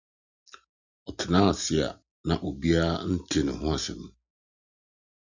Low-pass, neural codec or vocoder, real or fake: 7.2 kHz; none; real